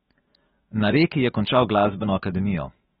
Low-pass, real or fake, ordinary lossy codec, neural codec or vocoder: 19.8 kHz; fake; AAC, 16 kbps; autoencoder, 48 kHz, 128 numbers a frame, DAC-VAE, trained on Japanese speech